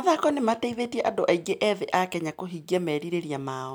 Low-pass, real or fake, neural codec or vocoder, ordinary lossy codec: none; real; none; none